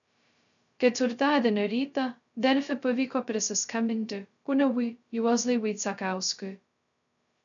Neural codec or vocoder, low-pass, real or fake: codec, 16 kHz, 0.2 kbps, FocalCodec; 7.2 kHz; fake